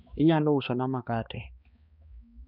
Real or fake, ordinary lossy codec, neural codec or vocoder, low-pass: fake; none; codec, 16 kHz, 2 kbps, X-Codec, HuBERT features, trained on balanced general audio; 5.4 kHz